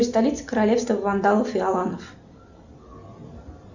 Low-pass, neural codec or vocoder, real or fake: 7.2 kHz; none; real